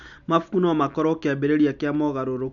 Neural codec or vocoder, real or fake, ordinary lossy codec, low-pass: none; real; none; 7.2 kHz